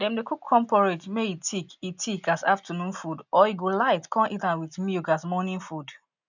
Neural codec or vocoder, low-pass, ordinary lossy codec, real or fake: none; 7.2 kHz; none; real